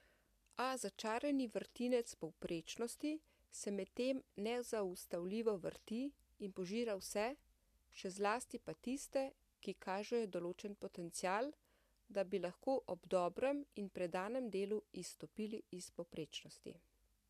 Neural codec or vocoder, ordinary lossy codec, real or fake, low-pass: none; MP3, 96 kbps; real; 14.4 kHz